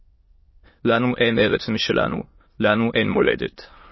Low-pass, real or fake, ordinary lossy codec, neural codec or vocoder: 7.2 kHz; fake; MP3, 24 kbps; autoencoder, 22.05 kHz, a latent of 192 numbers a frame, VITS, trained on many speakers